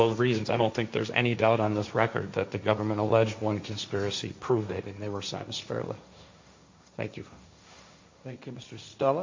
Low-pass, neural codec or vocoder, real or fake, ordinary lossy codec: 7.2 kHz; codec, 16 kHz, 1.1 kbps, Voila-Tokenizer; fake; MP3, 48 kbps